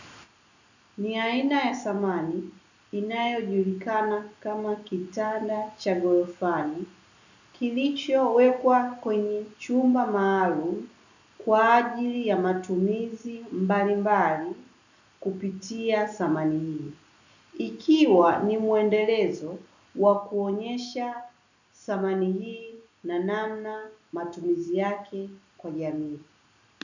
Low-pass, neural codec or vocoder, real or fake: 7.2 kHz; none; real